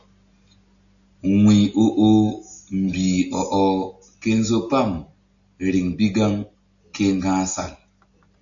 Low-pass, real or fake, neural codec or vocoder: 7.2 kHz; real; none